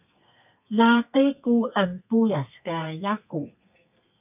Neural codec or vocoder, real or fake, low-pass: codec, 32 kHz, 1.9 kbps, SNAC; fake; 3.6 kHz